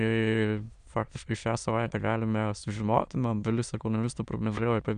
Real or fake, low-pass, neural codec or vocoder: fake; 9.9 kHz; autoencoder, 22.05 kHz, a latent of 192 numbers a frame, VITS, trained on many speakers